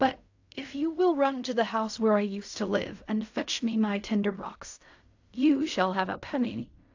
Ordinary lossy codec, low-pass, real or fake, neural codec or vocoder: AAC, 48 kbps; 7.2 kHz; fake; codec, 16 kHz in and 24 kHz out, 0.4 kbps, LongCat-Audio-Codec, fine tuned four codebook decoder